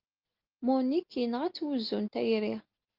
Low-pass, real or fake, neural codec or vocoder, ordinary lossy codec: 5.4 kHz; real; none; Opus, 32 kbps